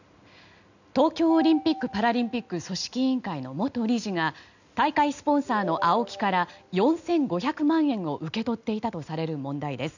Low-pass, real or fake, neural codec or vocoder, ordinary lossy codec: 7.2 kHz; real; none; none